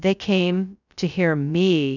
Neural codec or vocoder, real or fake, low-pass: codec, 16 kHz, 0.2 kbps, FocalCodec; fake; 7.2 kHz